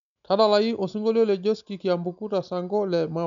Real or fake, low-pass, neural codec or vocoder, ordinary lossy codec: real; 7.2 kHz; none; none